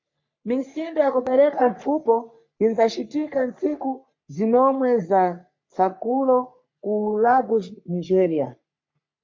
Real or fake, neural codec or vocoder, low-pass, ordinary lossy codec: fake; codec, 44.1 kHz, 3.4 kbps, Pupu-Codec; 7.2 kHz; MP3, 48 kbps